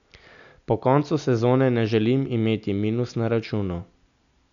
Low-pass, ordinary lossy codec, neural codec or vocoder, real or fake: 7.2 kHz; none; none; real